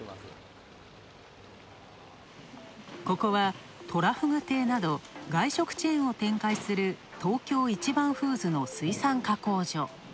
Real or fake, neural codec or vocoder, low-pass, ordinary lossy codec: real; none; none; none